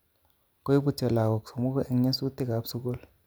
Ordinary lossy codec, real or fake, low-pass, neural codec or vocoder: none; real; none; none